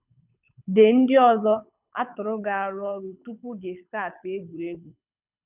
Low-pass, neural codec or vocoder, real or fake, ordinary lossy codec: 3.6 kHz; codec, 24 kHz, 6 kbps, HILCodec; fake; none